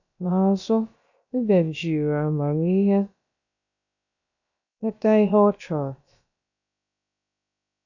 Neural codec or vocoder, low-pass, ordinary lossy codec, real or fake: codec, 16 kHz, about 1 kbps, DyCAST, with the encoder's durations; 7.2 kHz; none; fake